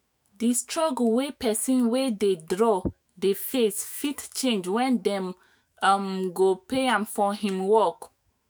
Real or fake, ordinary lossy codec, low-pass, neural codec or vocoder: fake; none; none; autoencoder, 48 kHz, 128 numbers a frame, DAC-VAE, trained on Japanese speech